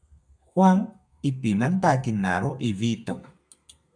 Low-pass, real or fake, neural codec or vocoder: 9.9 kHz; fake; codec, 32 kHz, 1.9 kbps, SNAC